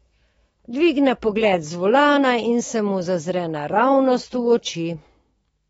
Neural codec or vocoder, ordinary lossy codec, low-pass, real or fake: autoencoder, 48 kHz, 128 numbers a frame, DAC-VAE, trained on Japanese speech; AAC, 24 kbps; 19.8 kHz; fake